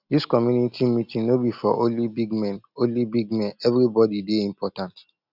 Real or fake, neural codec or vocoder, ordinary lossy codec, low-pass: real; none; none; 5.4 kHz